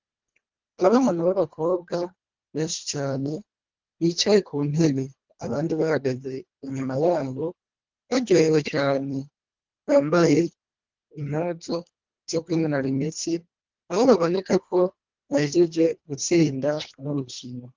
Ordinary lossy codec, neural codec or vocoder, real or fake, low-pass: Opus, 32 kbps; codec, 24 kHz, 1.5 kbps, HILCodec; fake; 7.2 kHz